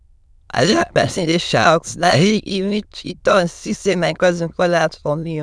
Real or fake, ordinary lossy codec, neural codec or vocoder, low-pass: fake; none; autoencoder, 22.05 kHz, a latent of 192 numbers a frame, VITS, trained on many speakers; none